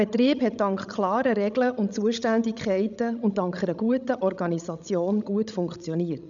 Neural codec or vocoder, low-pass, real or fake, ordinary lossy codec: codec, 16 kHz, 16 kbps, FreqCodec, larger model; 7.2 kHz; fake; none